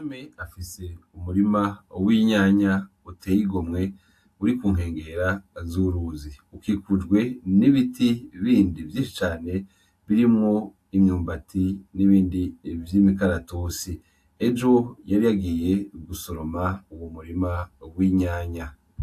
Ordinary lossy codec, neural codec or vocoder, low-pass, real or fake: AAC, 64 kbps; none; 14.4 kHz; real